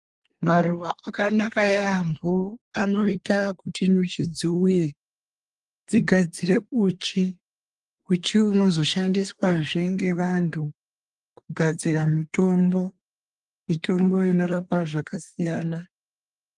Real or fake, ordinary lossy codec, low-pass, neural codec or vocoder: fake; Opus, 24 kbps; 10.8 kHz; codec, 24 kHz, 1 kbps, SNAC